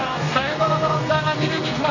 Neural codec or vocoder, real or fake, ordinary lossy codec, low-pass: codec, 32 kHz, 1.9 kbps, SNAC; fake; none; 7.2 kHz